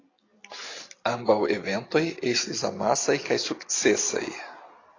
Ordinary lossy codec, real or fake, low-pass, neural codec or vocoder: AAC, 32 kbps; real; 7.2 kHz; none